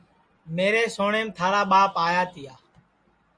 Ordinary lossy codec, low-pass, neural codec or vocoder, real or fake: Opus, 64 kbps; 9.9 kHz; none; real